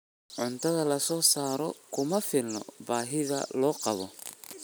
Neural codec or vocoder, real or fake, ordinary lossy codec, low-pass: none; real; none; none